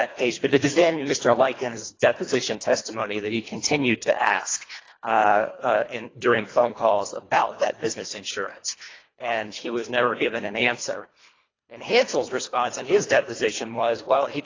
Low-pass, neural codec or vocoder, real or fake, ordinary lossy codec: 7.2 kHz; codec, 24 kHz, 1.5 kbps, HILCodec; fake; AAC, 32 kbps